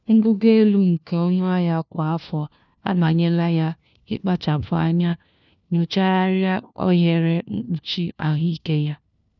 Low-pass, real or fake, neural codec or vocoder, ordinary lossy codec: 7.2 kHz; fake; codec, 16 kHz, 1 kbps, FunCodec, trained on LibriTTS, 50 frames a second; none